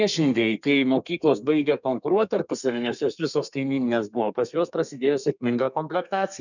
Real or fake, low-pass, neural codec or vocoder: fake; 7.2 kHz; codec, 32 kHz, 1.9 kbps, SNAC